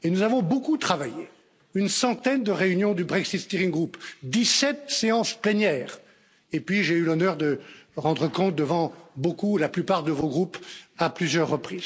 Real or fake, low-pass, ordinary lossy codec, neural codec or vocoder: real; none; none; none